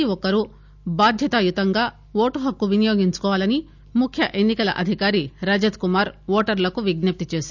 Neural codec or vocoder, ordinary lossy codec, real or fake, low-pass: none; none; real; 7.2 kHz